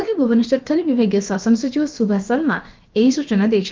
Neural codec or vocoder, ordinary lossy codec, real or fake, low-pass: codec, 16 kHz, about 1 kbps, DyCAST, with the encoder's durations; Opus, 32 kbps; fake; 7.2 kHz